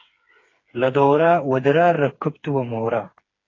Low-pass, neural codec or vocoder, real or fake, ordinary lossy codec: 7.2 kHz; codec, 16 kHz, 4 kbps, FreqCodec, smaller model; fake; AAC, 32 kbps